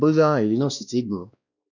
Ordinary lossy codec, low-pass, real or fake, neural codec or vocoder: none; 7.2 kHz; fake; codec, 16 kHz, 1 kbps, X-Codec, WavLM features, trained on Multilingual LibriSpeech